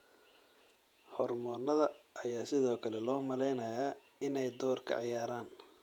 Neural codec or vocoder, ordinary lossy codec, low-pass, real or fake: vocoder, 48 kHz, 128 mel bands, Vocos; none; 19.8 kHz; fake